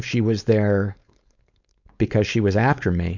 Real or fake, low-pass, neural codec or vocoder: fake; 7.2 kHz; codec, 16 kHz, 4.8 kbps, FACodec